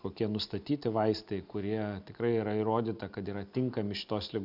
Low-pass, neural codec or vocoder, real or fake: 5.4 kHz; none; real